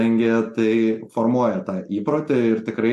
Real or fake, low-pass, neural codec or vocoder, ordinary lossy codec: real; 14.4 kHz; none; MP3, 64 kbps